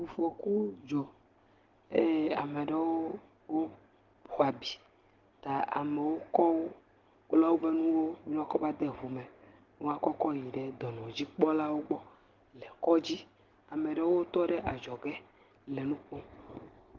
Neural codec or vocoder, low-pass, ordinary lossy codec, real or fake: none; 7.2 kHz; Opus, 16 kbps; real